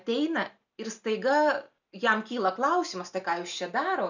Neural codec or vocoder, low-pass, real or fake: none; 7.2 kHz; real